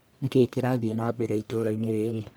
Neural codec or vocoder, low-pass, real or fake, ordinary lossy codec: codec, 44.1 kHz, 1.7 kbps, Pupu-Codec; none; fake; none